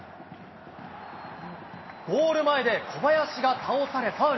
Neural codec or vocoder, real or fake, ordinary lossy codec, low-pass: none; real; MP3, 24 kbps; 7.2 kHz